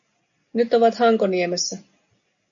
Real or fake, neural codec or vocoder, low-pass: real; none; 7.2 kHz